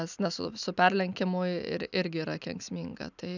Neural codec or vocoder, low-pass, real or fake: none; 7.2 kHz; real